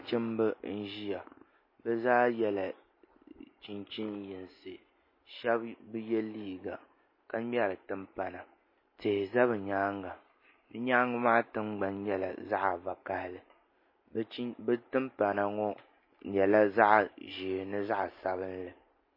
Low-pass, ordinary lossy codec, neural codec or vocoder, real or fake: 5.4 kHz; MP3, 24 kbps; none; real